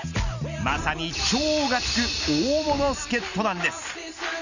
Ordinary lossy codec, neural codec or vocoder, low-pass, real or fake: none; none; 7.2 kHz; real